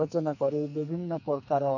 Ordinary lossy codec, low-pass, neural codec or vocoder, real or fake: none; 7.2 kHz; codec, 44.1 kHz, 2.6 kbps, SNAC; fake